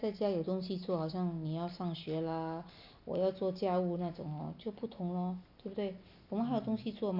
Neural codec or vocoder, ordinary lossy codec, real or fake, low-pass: none; none; real; 5.4 kHz